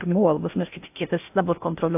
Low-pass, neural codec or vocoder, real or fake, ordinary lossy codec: 3.6 kHz; codec, 16 kHz in and 24 kHz out, 0.6 kbps, FocalCodec, streaming, 4096 codes; fake; AAC, 32 kbps